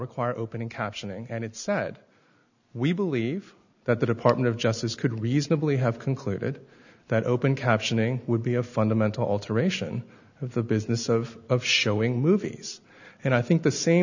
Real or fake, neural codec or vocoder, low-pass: real; none; 7.2 kHz